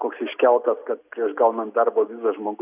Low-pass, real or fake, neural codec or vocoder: 3.6 kHz; real; none